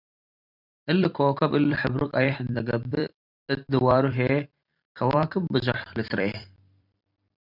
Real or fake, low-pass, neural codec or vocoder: fake; 5.4 kHz; vocoder, 44.1 kHz, 128 mel bands every 256 samples, BigVGAN v2